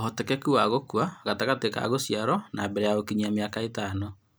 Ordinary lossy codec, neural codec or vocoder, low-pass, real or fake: none; none; none; real